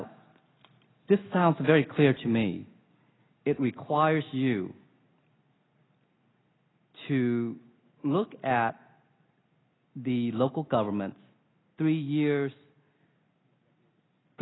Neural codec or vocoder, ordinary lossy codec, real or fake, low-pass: none; AAC, 16 kbps; real; 7.2 kHz